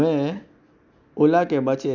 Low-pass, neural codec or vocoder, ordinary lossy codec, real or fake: 7.2 kHz; none; none; real